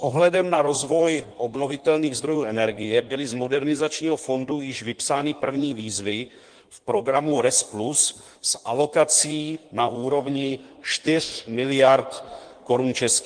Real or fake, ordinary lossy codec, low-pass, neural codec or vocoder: fake; Opus, 24 kbps; 9.9 kHz; codec, 16 kHz in and 24 kHz out, 1.1 kbps, FireRedTTS-2 codec